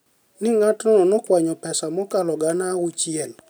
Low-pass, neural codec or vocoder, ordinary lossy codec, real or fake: none; none; none; real